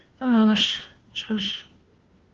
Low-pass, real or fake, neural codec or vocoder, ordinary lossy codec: 7.2 kHz; fake; codec, 16 kHz, 1 kbps, FunCodec, trained on LibriTTS, 50 frames a second; Opus, 16 kbps